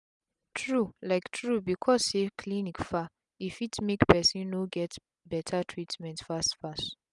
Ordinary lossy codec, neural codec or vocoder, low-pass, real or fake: none; none; 10.8 kHz; real